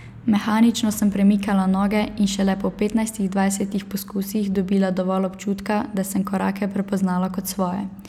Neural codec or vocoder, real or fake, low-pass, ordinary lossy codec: none; real; 19.8 kHz; none